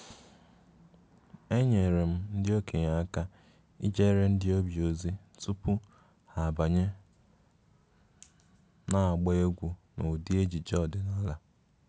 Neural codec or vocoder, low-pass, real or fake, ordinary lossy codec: none; none; real; none